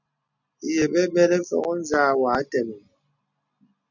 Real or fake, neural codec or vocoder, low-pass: real; none; 7.2 kHz